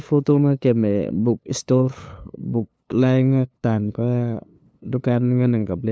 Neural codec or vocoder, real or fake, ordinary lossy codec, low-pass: codec, 16 kHz, 2 kbps, FunCodec, trained on LibriTTS, 25 frames a second; fake; none; none